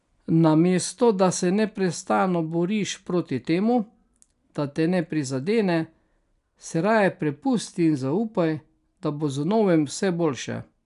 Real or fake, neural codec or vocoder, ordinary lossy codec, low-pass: real; none; none; 10.8 kHz